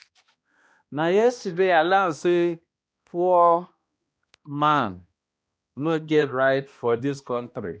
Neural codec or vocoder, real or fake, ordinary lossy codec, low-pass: codec, 16 kHz, 1 kbps, X-Codec, HuBERT features, trained on balanced general audio; fake; none; none